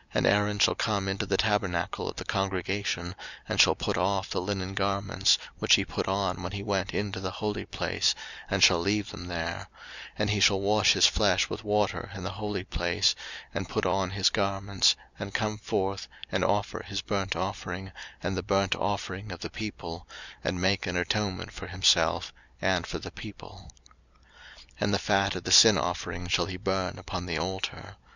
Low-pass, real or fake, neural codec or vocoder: 7.2 kHz; real; none